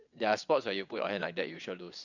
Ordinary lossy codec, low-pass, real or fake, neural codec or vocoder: none; 7.2 kHz; fake; vocoder, 22.05 kHz, 80 mel bands, WaveNeXt